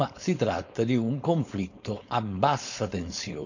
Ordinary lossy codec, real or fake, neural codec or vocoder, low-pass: none; fake; codec, 16 kHz, 4.8 kbps, FACodec; 7.2 kHz